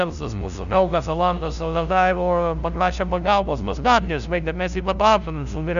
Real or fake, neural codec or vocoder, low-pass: fake; codec, 16 kHz, 0.5 kbps, FunCodec, trained on LibriTTS, 25 frames a second; 7.2 kHz